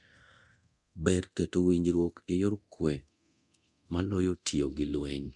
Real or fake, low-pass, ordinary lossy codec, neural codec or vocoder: fake; 10.8 kHz; MP3, 96 kbps; codec, 24 kHz, 0.9 kbps, DualCodec